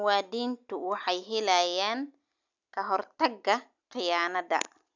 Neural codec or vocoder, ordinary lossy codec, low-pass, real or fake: none; none; 7.2 kHz; real